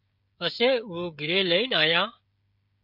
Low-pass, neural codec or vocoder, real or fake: 5.4 kHz; codec, 16 kHz, 16 kbps, FreqCodec, smaller model; fake